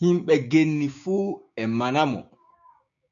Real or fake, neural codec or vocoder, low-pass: fake; codec, 16 kHz, 6 kbps, DAC; 7.2 kHz